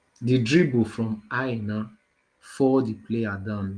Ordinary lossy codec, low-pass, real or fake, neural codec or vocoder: Opus, 24 kbps; 9.9 kHz; real; none